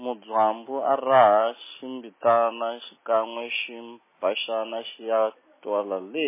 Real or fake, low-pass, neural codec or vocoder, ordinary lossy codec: real; 3.6 kHz; none; MP3, 16 kbps